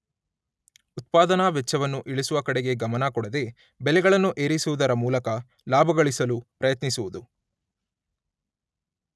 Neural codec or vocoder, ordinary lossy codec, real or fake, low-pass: none; none; real; none